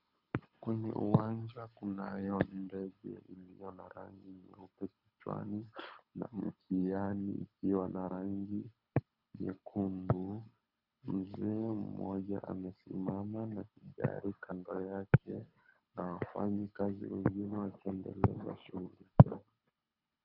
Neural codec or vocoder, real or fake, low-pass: codec, 24 kHz, 6 kbps, HILCodec; fake; 5.4 kHz